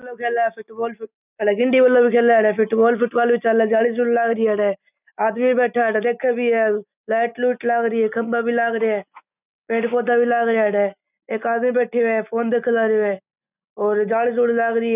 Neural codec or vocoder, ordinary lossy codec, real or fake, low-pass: none; none; real; 3.6 kHz